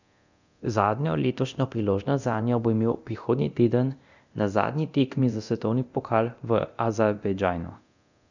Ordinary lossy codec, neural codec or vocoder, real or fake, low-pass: none; codec, 24 kHz, 0.9 kbps, DualCodec; fake; 7.2 kHz